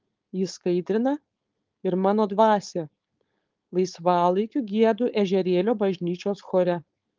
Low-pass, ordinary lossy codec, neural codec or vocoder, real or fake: 7.2 kHz; Opus, 32 kbps; codec, 16 kHz, 4.8 kbps, FACodec; fake